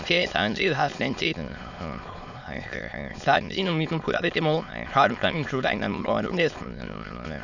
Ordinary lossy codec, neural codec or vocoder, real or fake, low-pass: none; autoencoder, 22.05 kHz, a latent of 192 numbers a frame, VITS, trained on many speakers; fake; 7.2 kHz